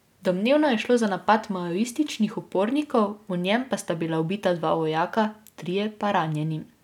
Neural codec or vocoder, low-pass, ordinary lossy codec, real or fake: none; 19.8 kHz; none; real